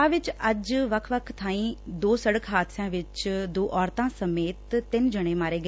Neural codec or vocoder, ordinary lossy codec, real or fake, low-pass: none; none; real; none